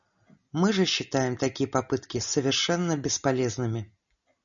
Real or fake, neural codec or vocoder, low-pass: real; none; 7.2 kHz